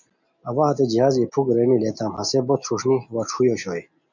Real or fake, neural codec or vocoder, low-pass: real; none; 7.2 kHz